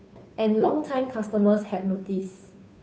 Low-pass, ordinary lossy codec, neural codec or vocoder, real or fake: none; none; codec, 16 kHz, 2 kbps, FunCodec, trained on Chinese and English, 25 frames a second; fake